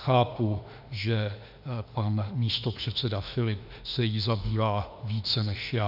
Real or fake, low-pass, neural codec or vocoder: fake; 5.4 kHz; autoencoder, 48 kHz, 32 numbers a frame, DAC-VAE, trained on Japanese speech